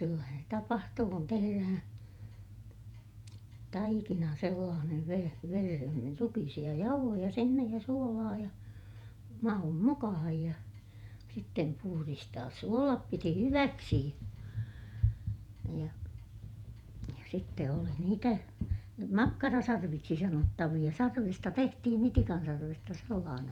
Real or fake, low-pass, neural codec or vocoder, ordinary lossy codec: fake; 19.8 kHz; vocoder, 48 kHz, 128 mel bands, Vocos; none